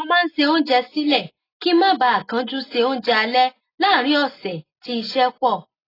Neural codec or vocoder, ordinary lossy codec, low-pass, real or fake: none; AAC, 24 kbps; 5.4 kHz; real